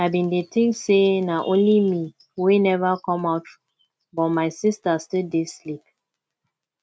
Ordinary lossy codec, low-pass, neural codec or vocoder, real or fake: none; none; none; real